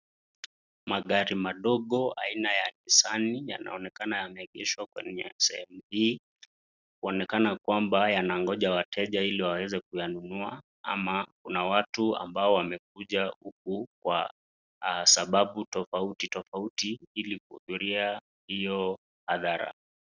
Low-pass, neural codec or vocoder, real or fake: 7.2 kHz; none; real